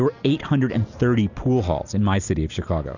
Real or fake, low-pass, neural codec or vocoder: real; 7.2 kHz; none